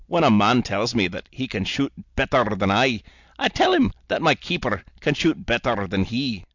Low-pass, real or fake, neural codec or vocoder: 7.2 kHz; real; none